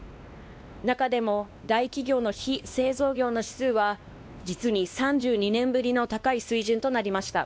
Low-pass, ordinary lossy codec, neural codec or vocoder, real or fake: none; none; codec, 16 kHz, 2 kbps, X-Codec, WavLM features, trained on Multilingual LibriSpeech; fake